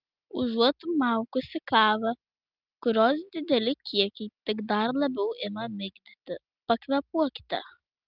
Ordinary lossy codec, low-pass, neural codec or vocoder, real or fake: Opus, 24 kbps; 5.4 kHz; none; real